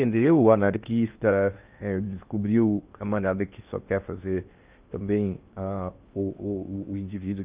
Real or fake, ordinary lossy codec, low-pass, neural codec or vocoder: fake; Opus, 24 kbps; 3.6 kHz; codec, 16 kHz in and 24 kHz out, 0.8 kbps, FocalCodec, streaming, 65536 codes